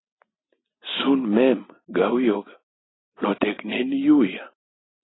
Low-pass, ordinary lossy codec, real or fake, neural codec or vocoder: 7.2 kHz; AAC, 16 kbps; real; none